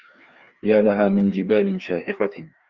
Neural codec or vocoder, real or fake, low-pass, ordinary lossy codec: codec, 16 kHz, 2 kbps, FreqCodec, larger model; fake; 7.2 kHz; Opus, 24 kbps